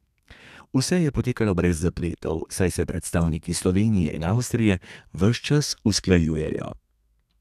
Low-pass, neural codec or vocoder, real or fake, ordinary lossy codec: 14.4 kHz; codec, 32 kHz, 1.9 kbps, SNAC; fake; none